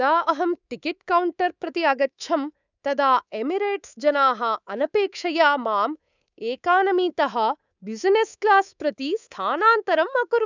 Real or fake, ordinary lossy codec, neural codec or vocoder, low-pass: fake; none; codec, 24 kHz, 3.1 kbps, DualCodec; 7.2 kHz